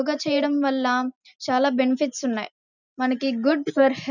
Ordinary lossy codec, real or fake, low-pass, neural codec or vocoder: none; real; 7.2 kHz; none